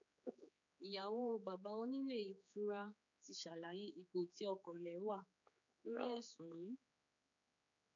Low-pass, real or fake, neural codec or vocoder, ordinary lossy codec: 7.2 kHz; fake; codec, 16 kHz, 4 kbps, X-Codec, HuBERT features, trained on general audio; AAC, 64 kbps